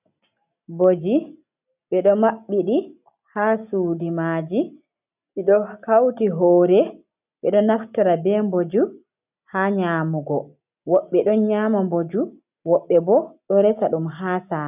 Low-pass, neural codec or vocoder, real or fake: 3.6 kHz; none; real